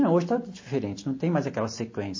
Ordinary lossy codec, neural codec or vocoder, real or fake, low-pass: MP3, 32 kbps; none; real; 7.2 kHz